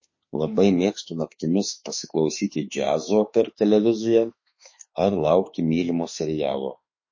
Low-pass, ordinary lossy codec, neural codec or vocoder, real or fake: 7.2 kHz; MP3, 32 kbps; autoencoder, 48 kHz, 32 numbers a frame, DAC-VAE, trained on Japanese speech; fake